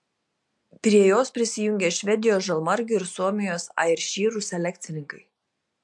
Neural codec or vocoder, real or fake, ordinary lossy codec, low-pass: none; real; MP3, 64 kbps; 10.8 kHz